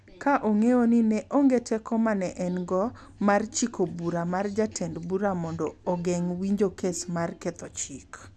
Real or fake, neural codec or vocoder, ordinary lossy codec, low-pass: real; none; none; none